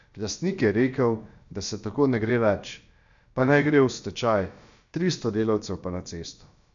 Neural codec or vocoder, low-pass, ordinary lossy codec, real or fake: codec, 16 kHz, about 1 kbps, DyCAST, with the encoder's durations; 7.2 kHz; MP3, 64 kbps; fake